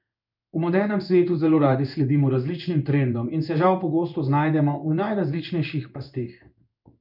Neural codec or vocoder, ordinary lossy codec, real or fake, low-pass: codec, 16 kHz in and 24 kHz out, 1 kbps, XY-Tokenizer; none; fake; 5.4 kHz